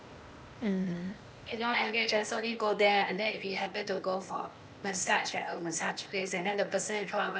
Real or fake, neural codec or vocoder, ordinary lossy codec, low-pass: fake; codec, 16 kHz, 0.8 kbps, ZipCodec; none; none